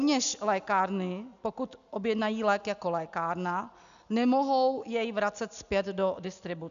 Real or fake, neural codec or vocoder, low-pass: real; none; 7.2 kHz